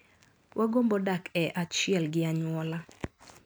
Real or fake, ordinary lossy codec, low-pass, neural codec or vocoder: real; none; none; none